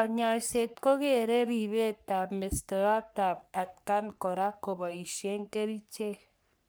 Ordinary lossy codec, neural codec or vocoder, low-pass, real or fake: none; codec, 44.1 kHz, 3.4 kbps, Pupu-Codec; none; fake